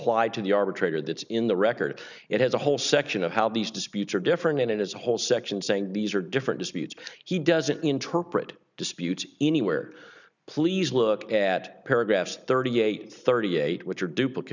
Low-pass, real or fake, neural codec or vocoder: 7.2 kHz; real; none